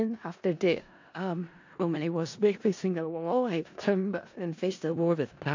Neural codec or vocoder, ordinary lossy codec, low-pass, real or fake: codec, 16 kHz in and 24 kHz out, 0.4 kbps, LongCat-Audio-Codec, four codebook decoder; AAC, 48 kbps; 7.2 kHz; fake